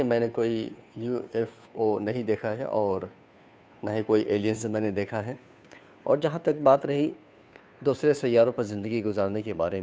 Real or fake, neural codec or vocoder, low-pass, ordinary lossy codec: fake; codec, 16 kHz, 2 kbps, FunCodec, trained on Chinese and English, 25 frames a second; none; none